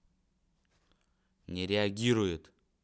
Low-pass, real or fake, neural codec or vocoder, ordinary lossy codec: none; real; none; none